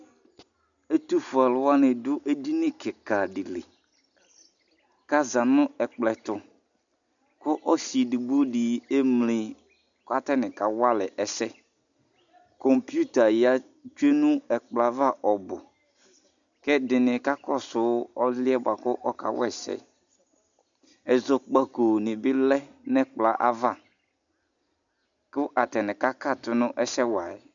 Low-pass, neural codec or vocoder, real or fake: 7.2 kHz; none; real